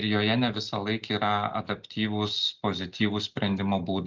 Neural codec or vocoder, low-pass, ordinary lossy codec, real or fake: none; 7.2 kHz; Opus, 32 kbps; real